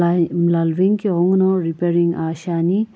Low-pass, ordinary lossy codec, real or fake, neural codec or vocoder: none; none; real; none